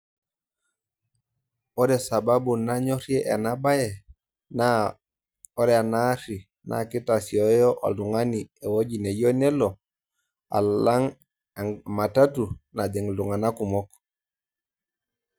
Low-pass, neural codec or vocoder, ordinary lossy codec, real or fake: none; none; none; real